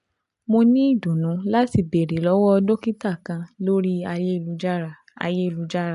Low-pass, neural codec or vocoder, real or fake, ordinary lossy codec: 10.8 kHz; none; real; none